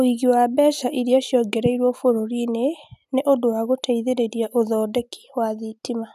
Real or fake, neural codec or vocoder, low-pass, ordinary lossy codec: real; none; 14.4 kHz; none